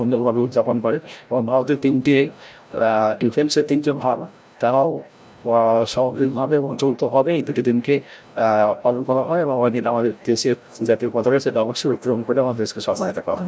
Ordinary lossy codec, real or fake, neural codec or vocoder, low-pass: none; fake; codec, 16 kHz, 0.5 kbps, FreqCodec, larger model; none